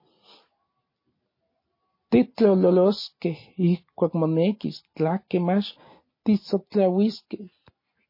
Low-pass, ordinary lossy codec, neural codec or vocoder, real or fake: 5.4 kHz; MP3, 24 kbps; none; real